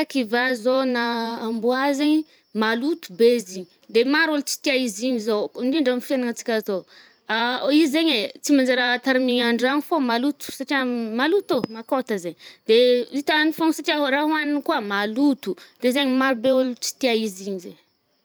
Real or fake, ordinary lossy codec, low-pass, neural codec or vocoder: fake; none; none; vocoder, 44.1 kHz, 128 mel bands every 512 samples, BigVGAN v2